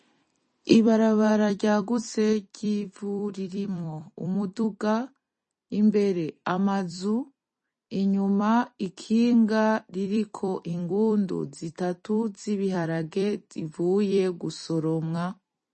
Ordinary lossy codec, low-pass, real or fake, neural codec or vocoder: MP3, 32 kbps; 10.8 kHz; fake; vocoder, 44.1 kHz, 128 mel bands every 256 samples, BigVGAN v2